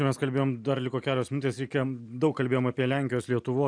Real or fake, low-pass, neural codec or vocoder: real; 9.9 kHz; none